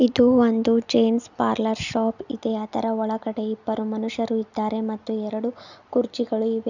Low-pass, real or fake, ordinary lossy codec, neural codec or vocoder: 7.2 kHz; real; none; none